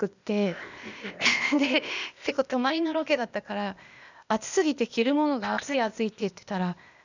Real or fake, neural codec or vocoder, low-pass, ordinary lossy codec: fake; codec, 16 kHz, 0.8 kbps, ZipCodec; 7.2 kHz; none